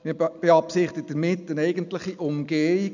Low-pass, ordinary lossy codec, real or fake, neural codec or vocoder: 7.2 kHz; none; real; none